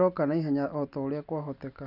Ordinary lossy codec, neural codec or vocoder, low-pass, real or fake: none; none; 5.4 kHz; real